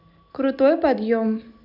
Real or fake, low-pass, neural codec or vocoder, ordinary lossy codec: real; 5.4 kHz; none; none